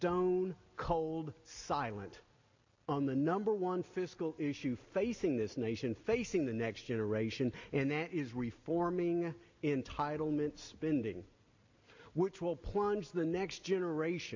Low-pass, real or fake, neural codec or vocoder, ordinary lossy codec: 7.2 kHz; real; none; AAC, 48 kbps